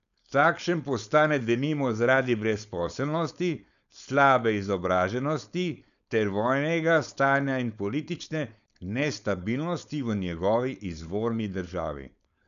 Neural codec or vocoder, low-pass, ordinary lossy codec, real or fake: codec, 16 kHz, 4.8 kbps, FACodec; 7.2 kHz; none; fake